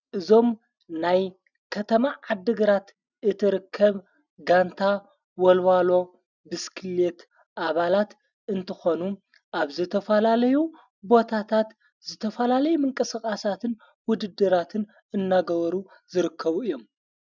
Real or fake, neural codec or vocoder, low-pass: real; none; 7.2 kHz